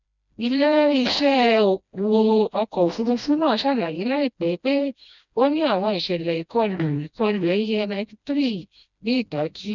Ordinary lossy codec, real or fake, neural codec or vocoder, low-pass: none; fake; codec, 16 kHz, 1 kbps, FreqCodec, smaller model; 7.2 kHz